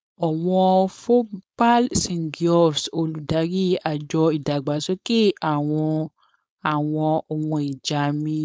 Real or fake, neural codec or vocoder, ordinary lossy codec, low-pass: fake; codec, 16 kHz, 4.8 kbps, FACodec; none; none